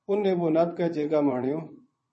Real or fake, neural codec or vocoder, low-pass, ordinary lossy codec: fake; vocoder, 22.05 kHz, 80 mel bands, Vocos; 9.9 kHz; MP3, 32 kbps